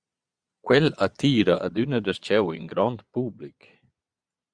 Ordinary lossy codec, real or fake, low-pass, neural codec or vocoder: Opus, 64 kbps; fake; 9.9 kHz; vocoder, 22.05 kHz, 80 mel bands, Vocos